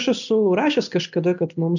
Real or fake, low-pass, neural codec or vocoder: real; 7.2 kHz; none